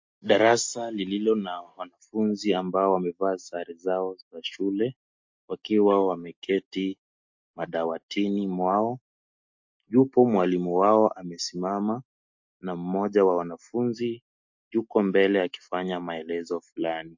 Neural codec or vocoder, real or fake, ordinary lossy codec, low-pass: none; real; MP3, 48 kbps; 7.2 kHz